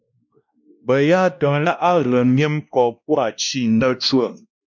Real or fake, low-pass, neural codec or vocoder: fake; 7.2 kHz; codec, 16 kHz, 1 kbps, X-Codec, WavLM features, trained on Multilingual LibriSpeech